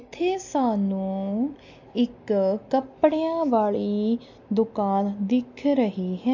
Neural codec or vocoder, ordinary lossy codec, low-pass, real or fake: none; MP3, 48 kbps; 7.2 kHz; real